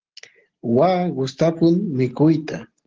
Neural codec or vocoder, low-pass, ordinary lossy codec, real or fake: none; 7.2 kHz; Opus, 16 kbps; real